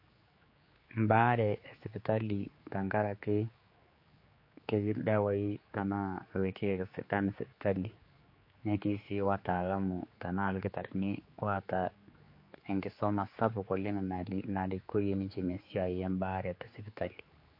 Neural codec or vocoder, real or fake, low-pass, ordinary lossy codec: codec, 16 kHz, 4 kbps, X-Codec, HuBERT features, trained on general audio; fake; 5.4 kHz; MP3, 32 kbps